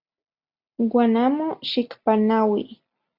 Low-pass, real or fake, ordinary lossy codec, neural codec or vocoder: 5.4 kHz; real; Opus, 64 kbps; none